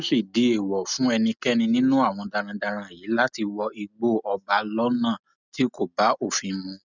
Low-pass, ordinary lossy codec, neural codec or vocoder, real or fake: 7.2 kHz; none; none; real